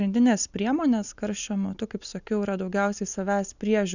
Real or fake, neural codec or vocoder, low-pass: real; none; 7.2 kHz